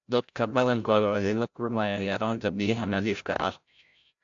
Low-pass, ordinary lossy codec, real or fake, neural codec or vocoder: 7.2 kHz; AAC, 64 kbps; fake; codec, 16 kHz, 0.5 kbps, FreqCodec, larger model